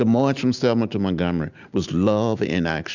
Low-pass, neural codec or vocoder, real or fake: 7.2 kHz; none; real